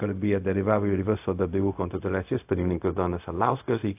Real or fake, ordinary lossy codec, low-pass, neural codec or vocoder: fake; AAC, 32 kbps; 3.6 kHz; codec, 16 kHz, 0.4 kbps, LongCat-Audio-Codec